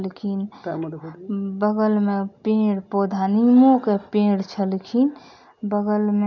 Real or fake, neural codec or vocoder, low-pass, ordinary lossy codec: real; none; 7.2 kHz; none